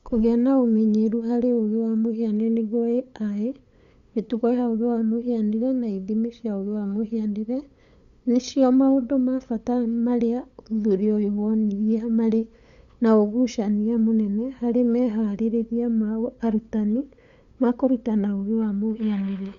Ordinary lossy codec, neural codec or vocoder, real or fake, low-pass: Opus, 64 kbps; codec, 16 kHz, 8 kbps, FunCodec, trained on LibriTTS, 25 frames a second; fake; 7.2 kHz